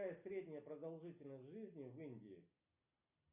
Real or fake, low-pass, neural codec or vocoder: real; 3.6 kHz; none